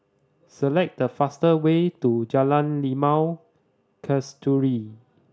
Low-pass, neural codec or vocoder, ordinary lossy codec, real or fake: none; none; none; real